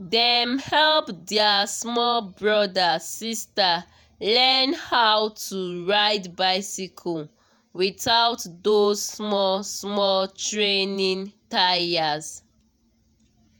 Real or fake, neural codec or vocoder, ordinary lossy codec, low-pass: fake; vocoder, 48 kHz, 128 mel bands, Vocos; none; none